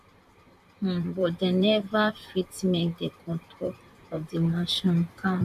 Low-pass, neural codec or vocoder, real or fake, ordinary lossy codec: 14.4 kHz; vocoder, 44.1 kHz, 128 mel bands, Pupu-Vocoder; fake; MP3, 96 kbps